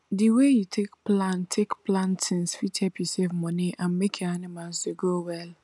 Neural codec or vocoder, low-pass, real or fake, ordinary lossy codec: none; none; real; none